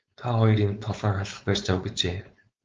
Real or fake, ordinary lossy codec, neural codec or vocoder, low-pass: fake; Opus, 24 kbps; codec, 16 kHz, 4.8 kbps, FACodec; 7.2 kHz